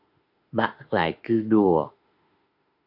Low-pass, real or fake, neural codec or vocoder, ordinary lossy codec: 5.4 kHz; fake; autoencoder, 48 kHz, 32 numbers a frame, DAC-VAE, trained on Japanese speech; AAC, 48 kbps